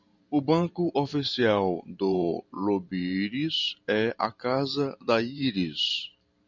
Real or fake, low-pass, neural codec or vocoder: fake; 7.2 kHz; vocoder, 44.1 kHz, 128 mel bands every 512 samples, BigVGAN v2